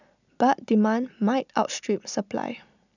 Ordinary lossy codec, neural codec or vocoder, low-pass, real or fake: none; none; 7.2 kHz; real